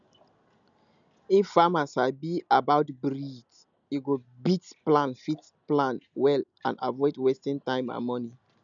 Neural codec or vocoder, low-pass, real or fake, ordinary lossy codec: none; 7.2 kHz; real; none